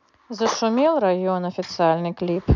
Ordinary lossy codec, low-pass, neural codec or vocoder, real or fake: none; 7.2 kHz; none; real